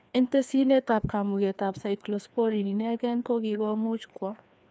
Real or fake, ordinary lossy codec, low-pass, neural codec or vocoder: fake; none; none; codec, 16 kHz, 2 kbps, FreqCodec, larger model